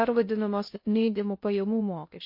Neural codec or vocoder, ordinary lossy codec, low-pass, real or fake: codec, 16 kHz in and 24 kHz out, 0.6 kbps, FocalCodec, streaming, 2048 codes; MP3, 32 kbps; 5.4 kHz; fake